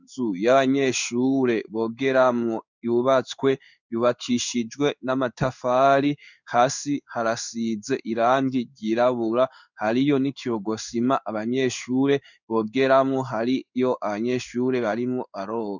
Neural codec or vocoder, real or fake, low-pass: codec, 16 kHz in and 24 kHz out, 1 kbps, XY-Tokenizer; fake; 7.2 kHz